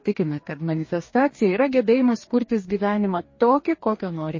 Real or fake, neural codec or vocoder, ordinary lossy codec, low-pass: fake; codec, 44.1 kHz, 2.6 kbps, DAC; MP3, 32 kbps; 7.2 kHz